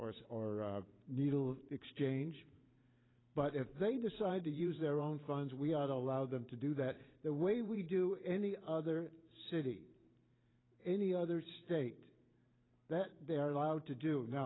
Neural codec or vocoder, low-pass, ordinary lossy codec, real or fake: vocoder, 44.1 kHz, 128 mel bands every 512 samples, BigVGAN v2; 7.2 kHz; AAC, 16 kbps; fake